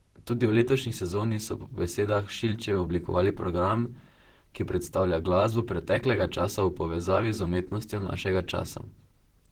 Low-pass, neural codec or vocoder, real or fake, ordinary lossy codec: 19.8 kHz; vocoder, 44.1 kHz, 128 mel bands, Pupu-Vocoder; fake; Opus, 16 kbps